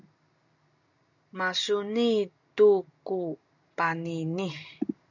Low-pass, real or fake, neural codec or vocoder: 7.2 kHz; real; none